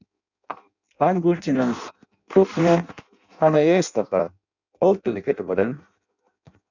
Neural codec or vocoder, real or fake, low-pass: codec, 16 kHz in and 24 kHz out, 0.6 kbps, FireRedTTS-2 codec; fake; 7.2 kHz